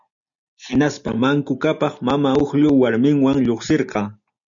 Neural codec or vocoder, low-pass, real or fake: none; 7.2 kHz; real